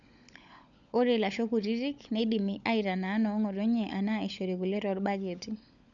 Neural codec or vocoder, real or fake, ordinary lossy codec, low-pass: codec, 16 kHz, 16 kbps, FunCodec, trained on LibriTTS, 50 frames a second; fake; none; 7.2 kHz